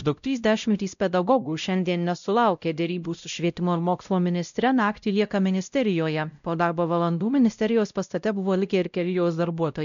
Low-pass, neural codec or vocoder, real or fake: 7.2 kHz; codec, 16 kHz, 0.5 kbps, X-Codec, WavLM features, trained on Multilingual LibriSpeech; fake